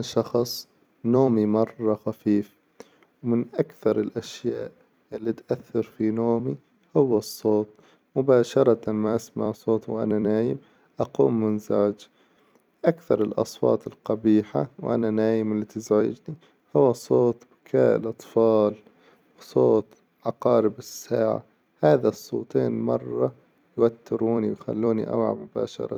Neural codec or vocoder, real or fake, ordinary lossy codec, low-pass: vocoder, 44.1 kHz, 128 mel bands every 256 samples, BigVGAN v2; fake; none; 19.8 kHz